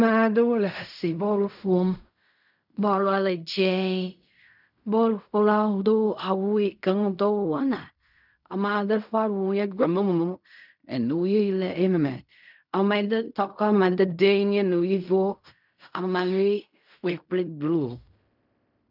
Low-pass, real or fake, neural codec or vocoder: 5.4 kHz; fake; codec, 16 kHz in and 24 kHz out, 0.4 kbps, LongCat-Audio-Codec, fine tuned four codebook decoder